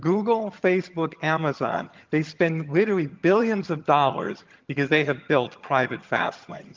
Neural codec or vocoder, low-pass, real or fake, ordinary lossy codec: vocoder, 22.05 kHz, 80 mel bands, HiFi-GAN; 7.2 kHz; fake; Opus, 24 kbps